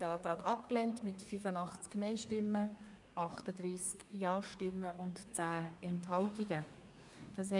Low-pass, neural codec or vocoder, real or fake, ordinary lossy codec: 10.8 kHz; codec, 24 kHz, 1 kbps, SNAC; fake; none